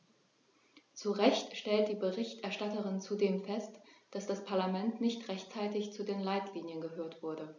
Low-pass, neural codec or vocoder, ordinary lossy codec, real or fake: 7.2 kHz; none; none; real